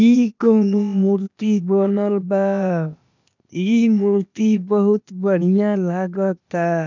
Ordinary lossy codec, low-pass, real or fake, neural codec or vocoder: none; 7.2 kHz; fake; codec, 16 kHz, 0.8 kbps, ZipCodec